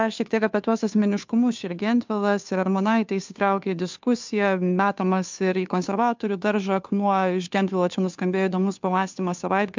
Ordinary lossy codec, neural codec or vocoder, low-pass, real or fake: MP3, 64 kbps; codec, 16 kHz, 2 kbps, FunCodec, trained on Chinese and English, 25 frames a second; 7.2 kHz; fake